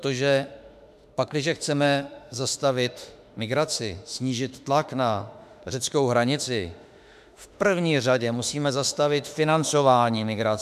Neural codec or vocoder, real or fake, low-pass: autoencoder, 48 kHz, 32 numbers a frame, DAC-VAE, trained on Japanese speech; fake; 14.4 kHz